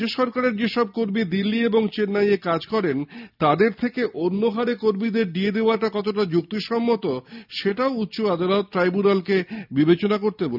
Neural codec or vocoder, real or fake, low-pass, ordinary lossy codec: none; real; 5.4 kHz; none